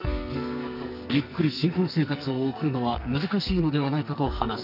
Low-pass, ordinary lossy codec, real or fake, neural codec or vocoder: 5.4 kHz; none; fake; codec, 44.1 kHz, 2.6 kbps, SNAC